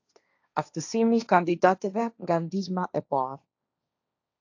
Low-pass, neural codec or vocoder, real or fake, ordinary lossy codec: 7.2 kHz; codec, 16 kHz, 1.1 kbps, Voila-Tokenizer; fake; AAC, 48 kbps